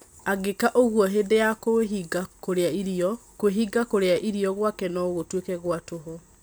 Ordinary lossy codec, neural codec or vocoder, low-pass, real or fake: none; none; none; real